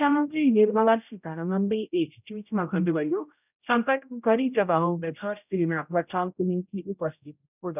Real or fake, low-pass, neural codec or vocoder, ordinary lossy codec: fake; 3.6 kHz; codec, 16 kHz, 0.5 kbps, X-Codec, HuBERT features, trained on general audio; none